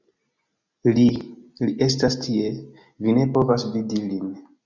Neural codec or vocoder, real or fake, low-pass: none; real; 7.2 kHz